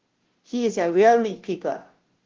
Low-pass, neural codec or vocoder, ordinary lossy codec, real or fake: 7.2 kHz; codec, 16 kHz, 0.5 kbps, FunCodec, trained on Chinese and English, 25 frames a second; Opus, 16 kbps; fake